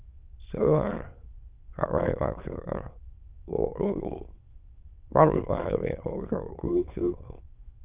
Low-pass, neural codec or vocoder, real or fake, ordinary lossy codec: 3.6 kHz; autoencoder, 22.05 kHz, a latent of 192 numbers a frame, VITS, trained on many speakers; fake; Opus, 32 kbps